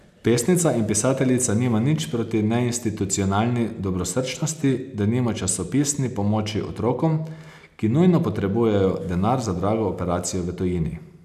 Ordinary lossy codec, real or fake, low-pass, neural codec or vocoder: none; real; 14.4 kHz; none